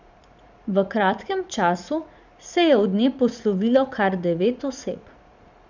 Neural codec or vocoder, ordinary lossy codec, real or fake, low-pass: vocoder, 44.1 kHz, 80 mel bands, Vocos; none; fake; 7.2 kHz